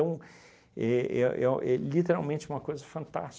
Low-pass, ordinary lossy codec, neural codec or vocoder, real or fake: none; none; none; real